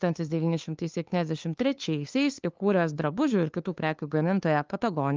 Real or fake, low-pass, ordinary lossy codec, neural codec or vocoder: fake; 7.2 kHz; Opus, 32 kbps; codec, 16 kHz, 2 kbps, FunCodec, trained on LibriTTS, 25 frames a second